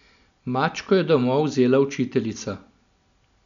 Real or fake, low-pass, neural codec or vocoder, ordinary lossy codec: real; 7.2 kHz; none; none